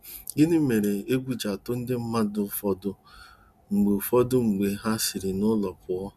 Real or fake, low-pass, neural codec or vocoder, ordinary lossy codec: real; 14.4 kHz; none; none